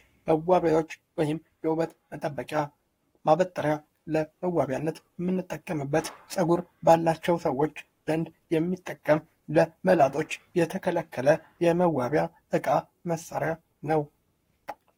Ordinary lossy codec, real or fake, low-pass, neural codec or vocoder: AAC, 48 kbps; fake; 19.8 kHz; codec, 44.1 kHz, 7.8 kbps, Pupu-Codec